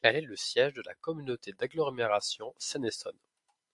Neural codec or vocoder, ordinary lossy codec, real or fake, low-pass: none; AAC, 64 kbps; real; 10.8 kHz